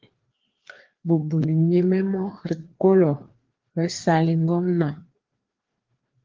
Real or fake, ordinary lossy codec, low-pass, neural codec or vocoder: fake; Opus, 16 kbps; 7.2 kHz; codec, 16 kHz, 2 kbps, FreqCodec, larger model